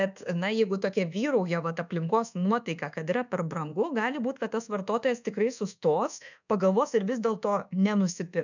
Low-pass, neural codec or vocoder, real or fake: 7.2 kHz; codec, 24 kHz, 1.2 kbps, DualCodec; fake